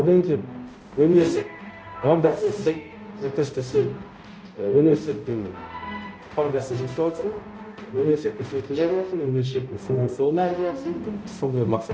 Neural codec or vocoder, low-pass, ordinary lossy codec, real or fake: codec, 16 kHz, 0.5 kbps, X-Codec, HuBERT features, trained on balanced general audio; none; none; fake